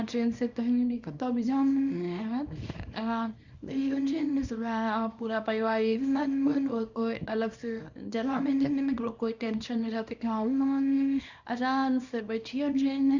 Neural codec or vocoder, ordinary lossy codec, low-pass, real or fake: codec, 24 kHz, 0.9 kbps, WavTokenizer, small release; Opus, 64 kbps; 7.2 kHz; fake